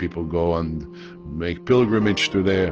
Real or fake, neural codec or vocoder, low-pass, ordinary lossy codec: real; none; 7.2 kHz; Opus, 16 kbps